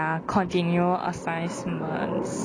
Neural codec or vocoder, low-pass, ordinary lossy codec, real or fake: none; 9.9 kHz; none; real